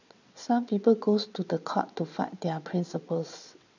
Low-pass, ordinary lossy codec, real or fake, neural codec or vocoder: 7.2 kHz; none; fake; vocoder, 22.05 kHz, 80 mel bands, WaveNeXt